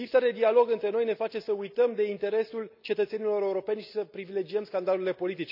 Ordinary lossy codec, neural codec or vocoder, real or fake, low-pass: none; none; real; 5.4 kHz